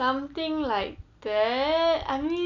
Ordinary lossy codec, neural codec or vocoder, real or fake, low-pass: none; none; real; 7.2 kHz